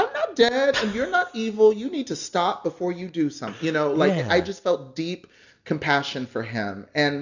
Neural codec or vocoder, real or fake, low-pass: none; real; 7.2 kHz